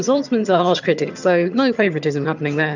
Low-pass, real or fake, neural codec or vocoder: 7.2 kHz; fake; vocoder, 22.05 kHz, 80 mel bands, HiFi-GAN